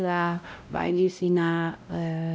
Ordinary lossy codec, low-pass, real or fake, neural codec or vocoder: none; none; fake; codec, 16 kHz, 0.5 kbps, X-Codec, WavLM features, trained on Multilingual LibriSpeech